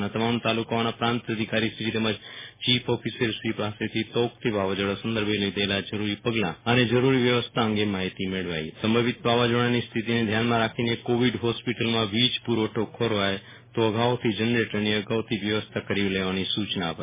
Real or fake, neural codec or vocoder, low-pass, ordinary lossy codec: real; none; 3.6 kHz; MP3, 16 kbps